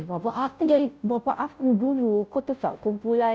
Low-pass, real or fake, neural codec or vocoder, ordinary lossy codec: none; fake; codec, 16 kHz, 0.5 kbps, FunCodec, trained on Chinese and English, 25 frames a second; none